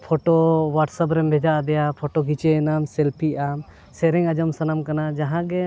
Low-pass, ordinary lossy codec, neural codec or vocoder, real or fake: none; none; none; real